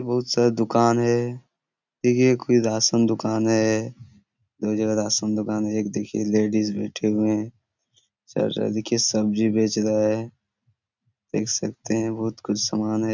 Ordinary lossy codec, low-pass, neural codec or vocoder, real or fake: none; 7.2 kHz; none; real